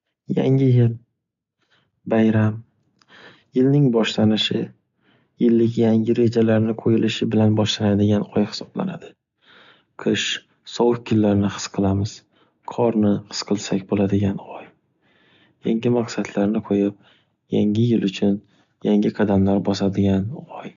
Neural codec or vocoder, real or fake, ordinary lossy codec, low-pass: none; real; none; 7.2 kHz